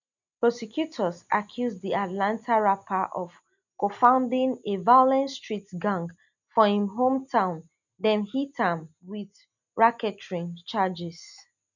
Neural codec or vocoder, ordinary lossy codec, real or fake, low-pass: none; none; real; 7.2 kHz